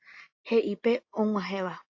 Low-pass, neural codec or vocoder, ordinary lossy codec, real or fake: 7.2 kHz; none; AAC, 48 kbps; real